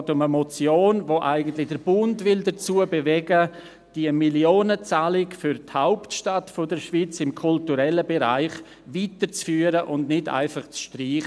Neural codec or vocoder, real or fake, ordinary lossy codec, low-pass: none; real; none; none